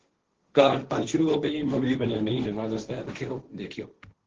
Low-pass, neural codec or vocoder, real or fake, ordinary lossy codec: 7.2 kHz; codec, 16 kHz, 1.1 kbps, Voila-Tokenizer; fake; Opus, 16 kbps